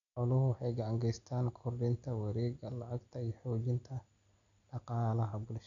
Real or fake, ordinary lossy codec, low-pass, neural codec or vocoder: real; none; 7.2 kHz; none